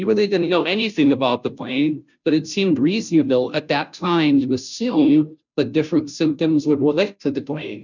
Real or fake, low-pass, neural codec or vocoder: fake; 7.2 kHz; codec, 16 kHz, 0.5 kbps, FunCodec, trained on Chinese and English, 25 frames a second